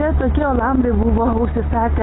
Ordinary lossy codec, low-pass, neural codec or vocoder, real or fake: AAC, 16 kbps; 7.2 kHz; none; real